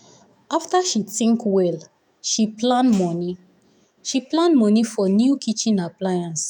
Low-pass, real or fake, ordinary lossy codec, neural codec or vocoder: 19.8 kHz; fake; none; autoencoder, 48 kHz, 128 numbers a frame, DAC-VAE, trained on Japanese speech